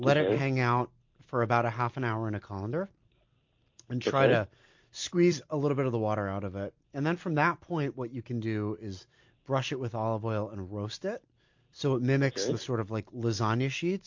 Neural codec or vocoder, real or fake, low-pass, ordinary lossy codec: none; real; 7.2 kHz; MP3, 48 kbps